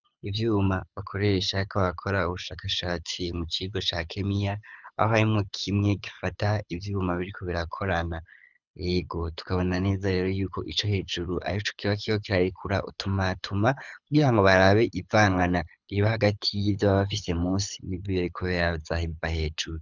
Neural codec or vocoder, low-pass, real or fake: codec, 24 kHz, 6 kbps, HILCodec; 7.2 kHz; fake